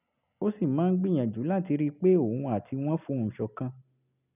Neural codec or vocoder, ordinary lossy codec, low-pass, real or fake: none; none; 3.6 kHz; real